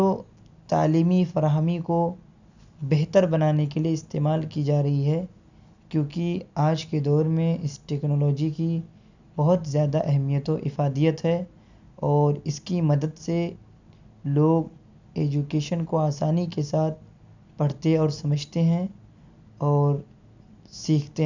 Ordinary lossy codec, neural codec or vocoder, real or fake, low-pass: none; none; real; 7.2 kHz